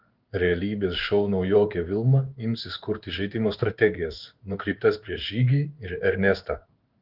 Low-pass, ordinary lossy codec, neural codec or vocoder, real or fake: 5.4 kHz; Opus, 32 kbps; codec, 16 kHz in and 24 kHz out, 1 kbps, XY-Tokenizer; fake